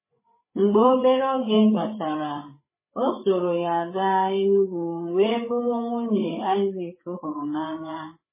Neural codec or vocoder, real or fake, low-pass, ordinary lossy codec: codec, 16 kHz, 8 kbps, FreqCodec, larger model; fake; 3.6 kHz; MP3, 16 kbps